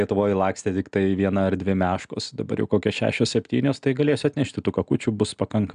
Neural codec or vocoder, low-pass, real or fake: none; 9.9 kHz; real